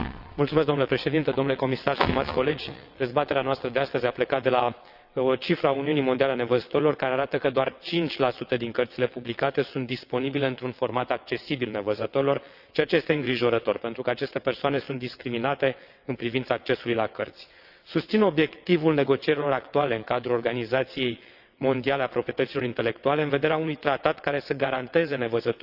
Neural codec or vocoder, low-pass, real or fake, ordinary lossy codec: vocoder, 22.05 kHz, 80 mel bands, WaveNeXt; 5.4 kHz; fake; none